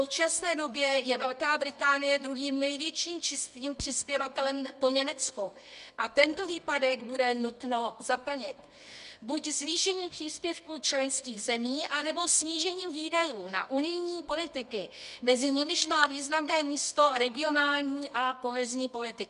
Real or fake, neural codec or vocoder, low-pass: fake; codec, 24 kHz, 0.9 kbps, WavTokenizer, medium music audio release; 10.8 kHz